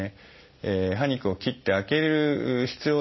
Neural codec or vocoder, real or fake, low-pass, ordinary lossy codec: none; real; 7.2 kHz; MP3, 24 kbps